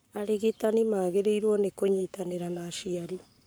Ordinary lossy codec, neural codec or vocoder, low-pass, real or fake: none; codec, 44.1 kHz, 7.8 kbps, Pupu-Codec; none; fake